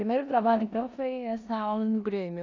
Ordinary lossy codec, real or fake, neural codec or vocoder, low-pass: AAC, 48 kbps; fake; codec, 16 kHz in and 24 kHz out, 0.9 kbps, LongCat-Audio-Codec, four codebook decoder; 7.2 kHz